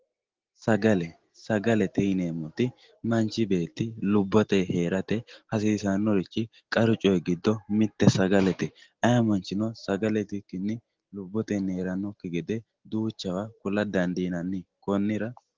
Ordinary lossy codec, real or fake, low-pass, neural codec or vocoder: Opus, 16 kbps; real; 7.2 kHz; none